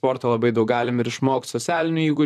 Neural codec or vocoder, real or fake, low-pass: vocoder, 44.1 kHz, 128 mel bands, Pupu-Vocoder; fake; 14.4 kHz